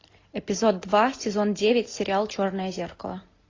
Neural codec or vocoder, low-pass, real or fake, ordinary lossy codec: none; 7.2 kHz; real; AAC, 32 kbps